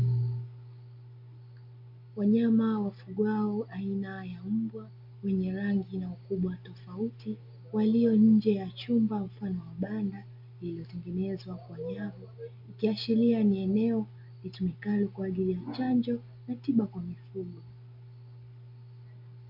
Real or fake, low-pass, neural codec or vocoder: real; 5.4 kHz; none